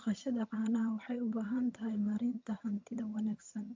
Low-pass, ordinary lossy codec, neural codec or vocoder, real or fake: 7.2 kHz; MP3, 64 kbps; vocoder, 22.05 kHz, 80 mel bands, HiFi-GAN; fake